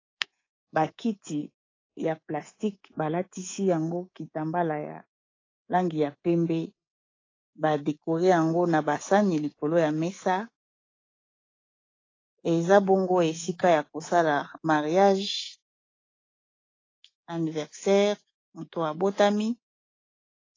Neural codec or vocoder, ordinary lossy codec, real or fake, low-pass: codec, 24 kHz, 3.1 kbps, DualCodec; AAC, 32 kbps; fake; 7.2 kHz